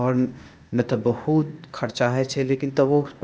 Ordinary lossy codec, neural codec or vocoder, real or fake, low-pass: none; codec, 16 kHz, 0.8 kbps, ZipCodec; fake; none